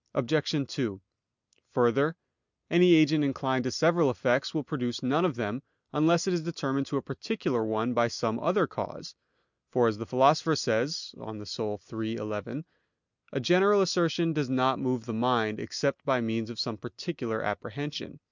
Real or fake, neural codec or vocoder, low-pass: real; none; 7.2 kHz